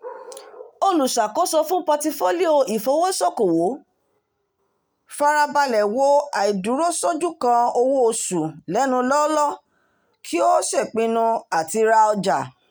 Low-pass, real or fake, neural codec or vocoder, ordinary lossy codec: none; real; none; none